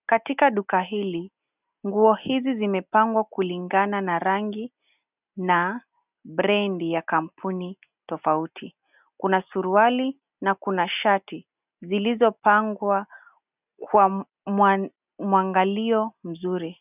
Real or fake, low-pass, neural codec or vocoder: real; 3.6 kHz; none